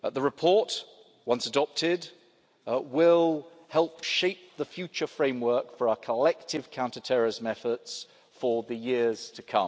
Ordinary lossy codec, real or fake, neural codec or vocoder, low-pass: none; real; none; none